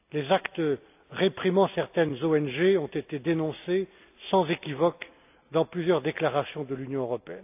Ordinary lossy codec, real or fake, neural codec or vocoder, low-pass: AAC, 32 kbps; real; none; 3.6 kHz